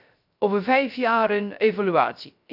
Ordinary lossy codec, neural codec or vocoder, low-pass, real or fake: none; codec, 16 kHz, 0.3 kbps, FocalCodec; 5.4 kHz; fake